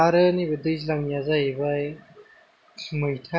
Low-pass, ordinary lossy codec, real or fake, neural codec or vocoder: none; none; real; none